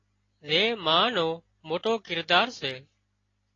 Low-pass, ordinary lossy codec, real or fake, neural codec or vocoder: 7.2 kHz; AAC, 32 kbps; real; none